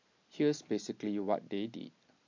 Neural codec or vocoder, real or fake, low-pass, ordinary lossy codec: none; real; 7.2 kHz; MP3, 48 kbps